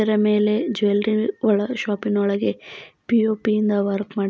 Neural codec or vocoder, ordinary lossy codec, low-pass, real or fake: none; none; none; real